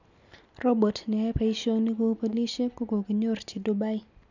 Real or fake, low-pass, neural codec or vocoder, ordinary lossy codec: real; 7.2 kHz; none; none